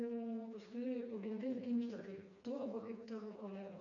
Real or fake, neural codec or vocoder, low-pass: fake; codec, 16 kHz, 2 kbps, FreqCodec, smaller model; 7.2 kHz